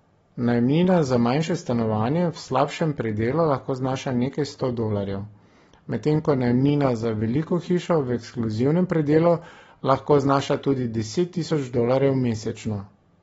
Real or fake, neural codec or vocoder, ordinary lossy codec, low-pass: real; none; AAC, 24 kbps; 19.8 kHz